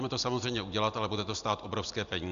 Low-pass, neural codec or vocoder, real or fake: 7.2 kHz; none; real